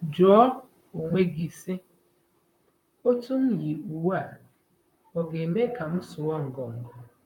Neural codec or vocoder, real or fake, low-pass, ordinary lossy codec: vocoder, 44.1 kHz, 128 mel bands, Pupu-Vocoder; fake; 19.8 kHz; none